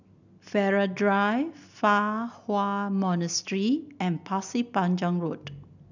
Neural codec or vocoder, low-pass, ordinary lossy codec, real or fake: none; 7.2 kHz; none; real